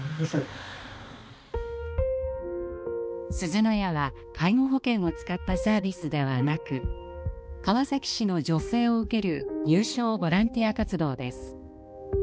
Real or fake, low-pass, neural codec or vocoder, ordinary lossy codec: fake; none; codec, 16 kHz, 2 kbps, X-Codec, HuBERT features, trained on balanced general audio; none